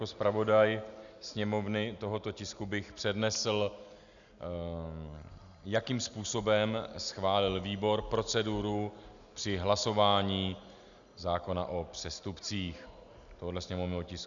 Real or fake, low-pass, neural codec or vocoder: real; 7.2 kHz; none